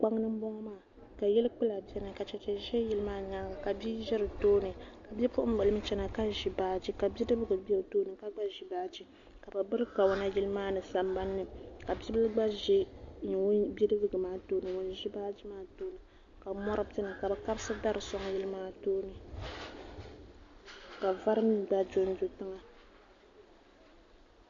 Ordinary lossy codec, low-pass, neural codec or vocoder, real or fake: AAC, 64 kbps; 7.2 kHz; none; real